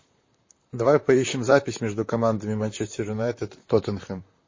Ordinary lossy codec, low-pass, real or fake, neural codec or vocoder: MP3, 32 kbps; 7.2 kHz; fake; vocoder, 44.1 kHz, 128 mel bands, Pupu-Vocoder